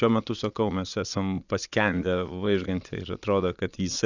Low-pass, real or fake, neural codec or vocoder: 7.2 kHz; fake; vocoder, 22.05 kHz, 80 mel bands, Vocos